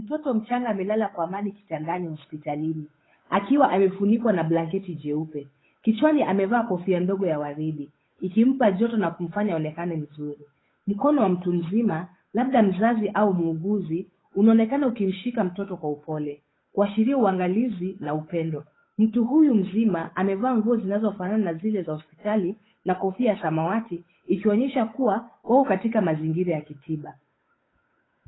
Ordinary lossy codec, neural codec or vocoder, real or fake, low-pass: AAC, 16 kbps; codec, 16 kHz, 8 kbps, FunCodec, trained on Chinese and English, 25 frames a second; fake; 7.2 kHz